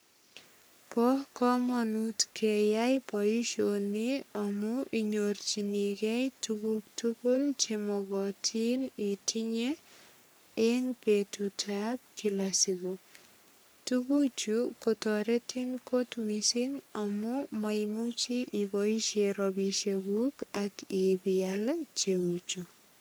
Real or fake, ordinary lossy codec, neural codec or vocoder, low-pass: fake; none; codec, 44.1 kHz, 3.4 kbps, Pupu-Codec; none